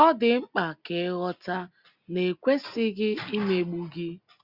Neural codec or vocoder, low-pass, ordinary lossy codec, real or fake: none; 5.4 kHz; Opus, 64 kbps; real